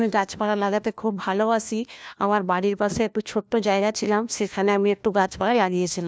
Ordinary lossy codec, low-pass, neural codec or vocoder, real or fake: none; none; codec, 16 kHz, 1 kbps, FunCodec, trained on LibriTTS, 50 frames a second; fake